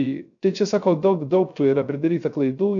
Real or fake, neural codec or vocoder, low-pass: fake; codec, 16 kHz, 0.3 kbps, FocalCodec; 7.2 kHz